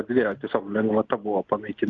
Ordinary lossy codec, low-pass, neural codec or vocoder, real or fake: Opus, 32 kbps; 7.2 kHz; none; real